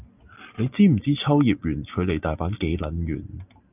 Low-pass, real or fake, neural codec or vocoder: 3.6 kHz; real; none